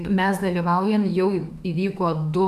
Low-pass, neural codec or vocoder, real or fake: 14.4 kHz; autoencoder, 48 kHz, 32 numbers a frame, DAC-VAE, trained on Japanese speech; fake